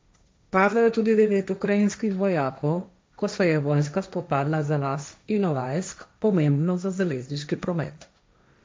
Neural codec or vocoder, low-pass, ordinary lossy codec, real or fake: codec, 16 kHz, 1.1 kbps, Voila-Tokenizer; none; none; fake